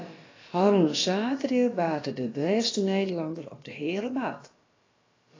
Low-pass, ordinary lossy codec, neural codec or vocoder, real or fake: 7.2 kHz; AAC, 32 kbps; codec, 16 kHz, about 1 kbps, DyCAST, with the encoder's durations; fake